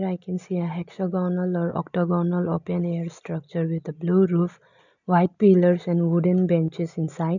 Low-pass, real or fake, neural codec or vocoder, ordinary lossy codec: 7.2 kHz; real; none; none